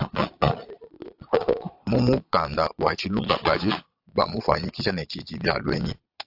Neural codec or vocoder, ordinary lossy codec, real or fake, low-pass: vocoder, 22.05 kHz, 80 mel bands, Vocos; AAC, 48 kbps; fake; 5.4 kHz